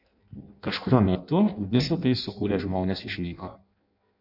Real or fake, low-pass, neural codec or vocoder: fake; 5.4 kHz; codec, 16 kHz in and 24 kHz out, 0.6 kbps, FireRedTTS-2 codec